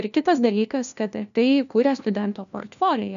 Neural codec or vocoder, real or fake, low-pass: codec, 16 kHz, 1 kbps, FunCodec, trained on LibriTTS, 50 frames a second; fake; 7.2 kHz